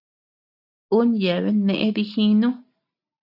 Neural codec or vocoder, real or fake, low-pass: none; real; 5.4 kHz